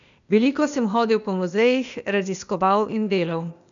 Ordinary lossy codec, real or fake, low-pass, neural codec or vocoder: none; fake; 7.2 kHz; codec, 16 kHz, 0.8 kbps, ZipCodec